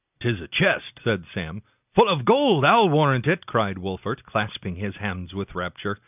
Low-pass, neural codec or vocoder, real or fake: 3.6 kHz; none; real